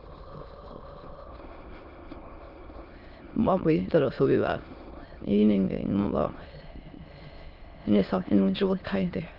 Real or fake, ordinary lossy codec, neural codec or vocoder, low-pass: fake; Opus, 32 kbps; autoencoder, 22.05 kHz, a latent of 192 numbers a frame, VITS, trained on many speakers; 5.4 kHz